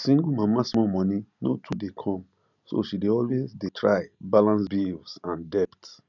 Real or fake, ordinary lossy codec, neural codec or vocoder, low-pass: real; none; none; 7.2 kHz